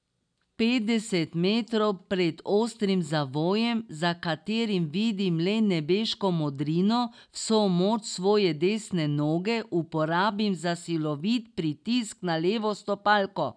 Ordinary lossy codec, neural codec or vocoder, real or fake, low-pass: none; none; real; 9.9 kHz